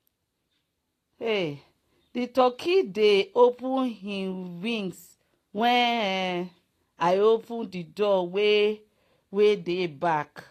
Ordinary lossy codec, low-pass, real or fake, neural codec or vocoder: AAC, 48 kbps; 14.4 kHz; real; none